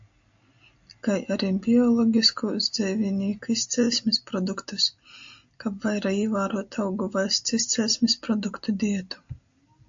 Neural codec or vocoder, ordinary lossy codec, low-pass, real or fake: none; AAC, 64 kbps; 7.2 kHz; real